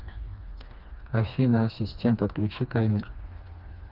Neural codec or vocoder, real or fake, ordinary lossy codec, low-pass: codec, 16 kHz, 2 kbps, FreqCodec, smaller model; fake; Opus, 24 kbps; 5.4 kHz